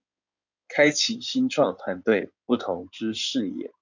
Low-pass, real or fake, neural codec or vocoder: 7.2 kHz; fake; codec, 16 kHz in and 24 kHz out, 2.2 kbps, FireRedTTS-2 codec